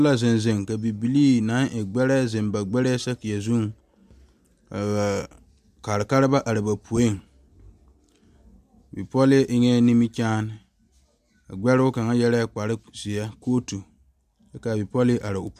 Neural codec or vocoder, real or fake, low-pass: none; real; 14.4 kHz